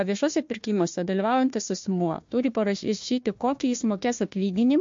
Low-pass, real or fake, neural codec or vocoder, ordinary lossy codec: 7.2 kHz; fake; codec, 16 kHz, 1 kbps, FunCodec, trained on Chinese and English, 50 frames a second; MP3, 48 kbps